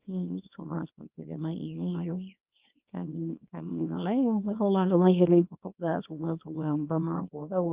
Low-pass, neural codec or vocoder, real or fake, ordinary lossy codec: 3.6 kHz; codec, 24 kHz, 0.9 kbps, WavTokenizer, small release; fake; Opus, 24 kbps